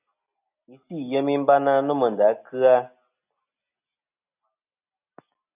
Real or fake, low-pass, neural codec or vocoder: real; 3.6 kHz; none